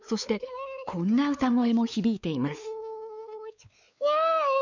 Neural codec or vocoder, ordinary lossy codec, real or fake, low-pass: codec, 16 kHz, 4 kbps, X-Codec, WavLM features, trained on Multilingual LibriSpeech; none; fake; 7.2 kHz